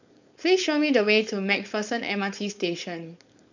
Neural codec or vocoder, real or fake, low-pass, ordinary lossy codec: codec, 16 kHz, 4.8 kbps, FACodec; fake; 7.2 kHz; none